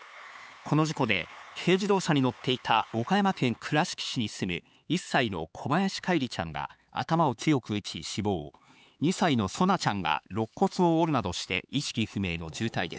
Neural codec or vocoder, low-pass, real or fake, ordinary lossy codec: codec, 16 kHz, 4 kbps, X-Codec, HuBERT features, trained on LibriSpeech; none; fake; none